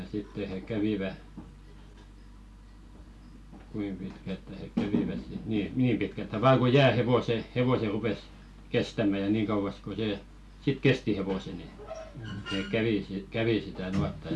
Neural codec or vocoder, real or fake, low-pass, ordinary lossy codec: none; real; none; none